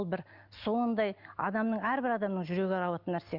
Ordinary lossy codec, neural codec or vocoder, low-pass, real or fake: Opus, 24 kbps; none; 5.4 kHz; real